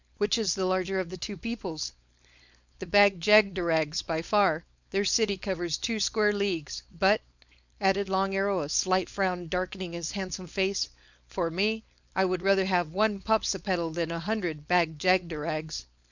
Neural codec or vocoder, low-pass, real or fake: codec, 16 kHz, 4.8 kbps, FACodec; 7.2 kHz; fake